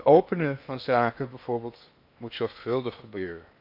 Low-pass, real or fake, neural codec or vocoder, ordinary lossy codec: 5.4 kHz; fake; codec, 16 kHz in and 24 kHz out, 0.8 kbps, FocalCodec, streaming, 65536 codes; AAC, 48 kbps